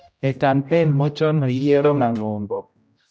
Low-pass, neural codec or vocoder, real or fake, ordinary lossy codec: none; codec, 16 kHz, 0.5 kbps, X-Codec, HuBERT features, trained on general audio; fake; none